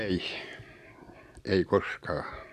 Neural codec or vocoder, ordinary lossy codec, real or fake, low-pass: none; none; real; 14.4 kHz